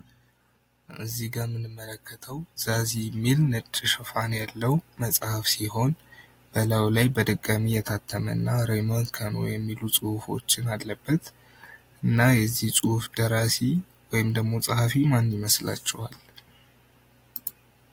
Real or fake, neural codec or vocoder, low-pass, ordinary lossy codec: real; none; 19.8 kHz; AAC, 48 kbps